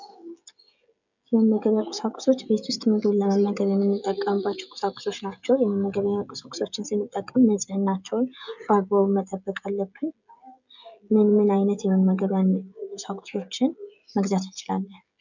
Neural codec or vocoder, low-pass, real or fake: codec, 16 kHz, 16 kbps, FreqCodec, smaller model; 7.2 kHz; fake